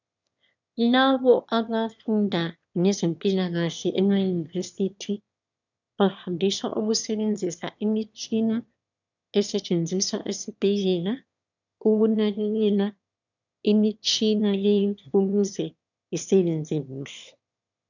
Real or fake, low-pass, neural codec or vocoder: fake; 7.2 kHz; autoencoder, 22.05 kHz, a latent of 192 numbers a frame, VITS, trained on one speaker